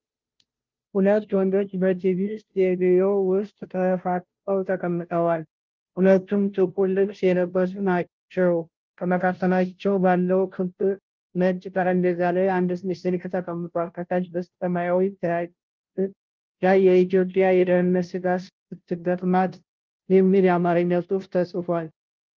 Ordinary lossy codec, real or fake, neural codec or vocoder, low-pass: Opus, 32 kbps; fake; codec, 16 kHz, 0.5 kbps, FunCodec, trained on Chinese and English, 25 frames a second; 7.2 kHz